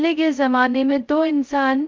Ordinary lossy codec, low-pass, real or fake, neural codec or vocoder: Opus, 32 kbps; 7.2 kHz; fake; codec, 16 kHz, 0.2 kbps, FocalCodec